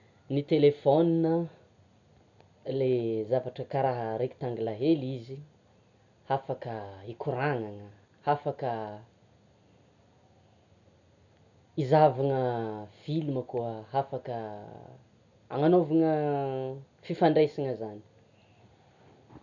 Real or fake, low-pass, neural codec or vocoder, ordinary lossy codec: real; 7.2 kHz; none; none